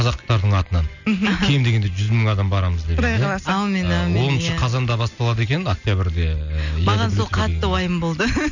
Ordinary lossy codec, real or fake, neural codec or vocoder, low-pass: none; real; none; 7.2 kHz